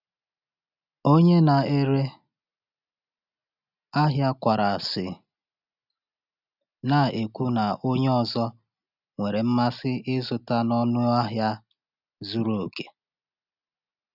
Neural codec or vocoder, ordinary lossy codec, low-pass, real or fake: none; none; 5.4 kHz; real